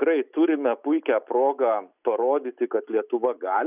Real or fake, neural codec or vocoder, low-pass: real; none; 3.6 kHz